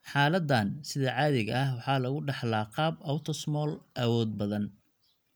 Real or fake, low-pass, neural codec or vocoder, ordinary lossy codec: real; none; none; none